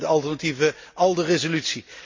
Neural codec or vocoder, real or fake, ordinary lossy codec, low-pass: none; real; MP3, 48 kbps; 7.2 kHz